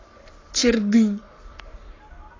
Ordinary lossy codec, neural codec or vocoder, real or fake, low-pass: AAC, 32 kbps; codec, 44.1 kHz, 7.8 kbps, Pupu-Codec; fake; 7.2 kHz